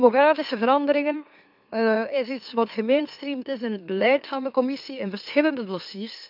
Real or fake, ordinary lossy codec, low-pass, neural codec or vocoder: fake; AAC, 48 kbps; 5.4 kHz; autoencoder, 44.1 kHz, a latent of 192 numbers a frame, MeloTTS